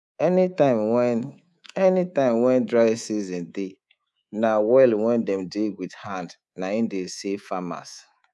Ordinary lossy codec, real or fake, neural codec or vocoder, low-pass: none; fake; codec, 24 kHz, 3.1 kbps, DualCodec; none